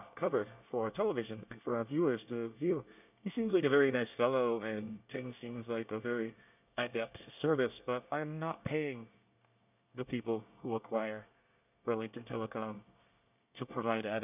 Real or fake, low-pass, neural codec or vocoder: fake; 3.6 kHz; codec, 24 kHz, 1 kbps, SNAC